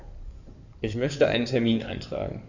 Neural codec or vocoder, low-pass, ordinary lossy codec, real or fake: codec, 16 kHz in and 24 kHz out, 2.2 kbps, FireRedTTS-2 codec; 7.2 kHz; none; fake